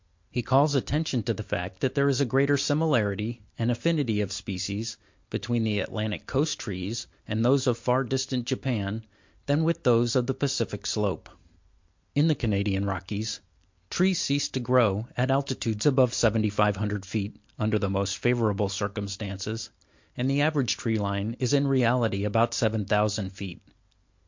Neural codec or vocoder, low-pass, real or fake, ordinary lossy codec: none; 7.2 kHz; real; MP3, 48 kbps